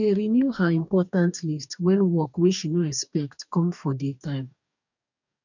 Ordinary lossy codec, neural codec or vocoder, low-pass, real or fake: none; codec, 44.1 kHz, 2.6 kbps, DAC; 7.2 kHz; fake